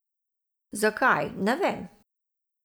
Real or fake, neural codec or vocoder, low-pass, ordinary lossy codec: real; none; none; none